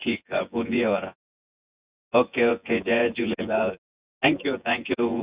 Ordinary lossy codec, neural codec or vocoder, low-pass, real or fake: Opus, 64 kbps; vocoder, 24 kHz, 100 mel bands, Vocos; 3.6 kHz; fake